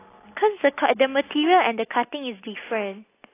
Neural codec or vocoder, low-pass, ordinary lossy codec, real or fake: none; 3.6 kHz; AAC, 24 kbps; real